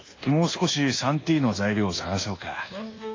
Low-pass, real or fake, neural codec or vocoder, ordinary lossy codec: 7.2 kHz; fake; codec, 24 kHz, 1.2 kbps, DualCodec; AAC, 32 kbps